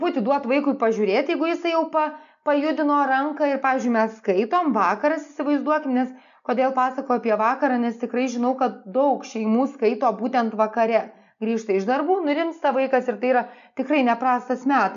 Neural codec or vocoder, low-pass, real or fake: none; 7.2 kHz; real